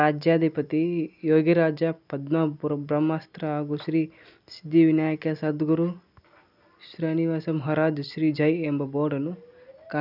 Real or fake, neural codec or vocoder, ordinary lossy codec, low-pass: real; none; none; 5.4 kHz